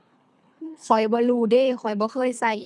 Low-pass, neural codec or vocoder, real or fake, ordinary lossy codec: none; codec, 24 kHz, 3 kbps, HILCodec; fake; none